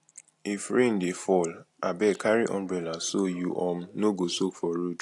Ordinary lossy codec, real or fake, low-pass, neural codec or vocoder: AAC, 48 kbps; real; 10.8 kHz; none